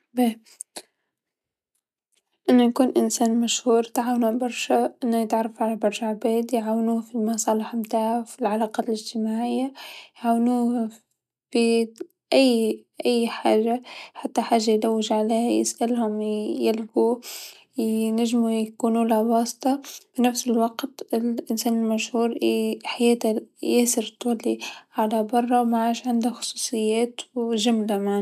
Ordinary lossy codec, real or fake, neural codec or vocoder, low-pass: none; real; none; 14.4 kHz